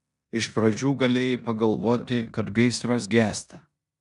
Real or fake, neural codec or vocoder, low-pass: fake; codec, 16 kHz in and 24 kHz out, 0.9 kbps, LongCat-Audio-Codec, four codebook decoder; 10.8 kHz